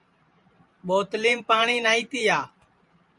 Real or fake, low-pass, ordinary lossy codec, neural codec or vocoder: fake; 10.8 kHz; Opus, 64 kbps; vocoder, 44.1 kHz, 128 mel bands every 512 samples, BigVGAN v2